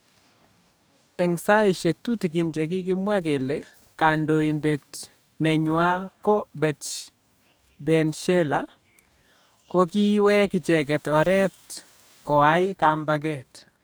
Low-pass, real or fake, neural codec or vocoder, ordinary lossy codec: none; fake; codec, 44.1 kHz, 2.6 kbps, DAC; none